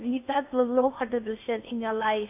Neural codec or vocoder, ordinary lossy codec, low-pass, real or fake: codec, 16 kHz in and 24 kHz out, 0.6 kbps, FocalCodec, streaming, 2048 codes; AAC, 32 kbps; 3.6 kHz; fake